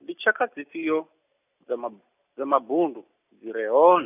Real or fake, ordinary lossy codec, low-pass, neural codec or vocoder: real; none; 3.6 kHz; none